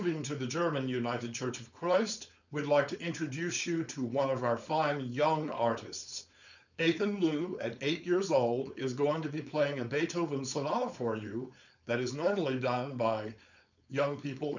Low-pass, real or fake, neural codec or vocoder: 7.2 kHz; fake; codec, 16 kHz, 4.8 kbps, FACodec